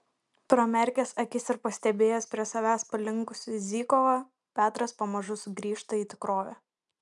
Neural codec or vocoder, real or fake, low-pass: none; real; 10.8 kHz